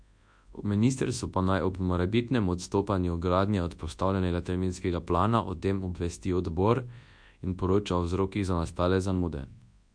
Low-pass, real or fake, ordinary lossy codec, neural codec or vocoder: 9.9 kHz; fake; MP3, 64 kbps; codec, 24 kHz, 0.9 kbps, WavTokenizer, large speech release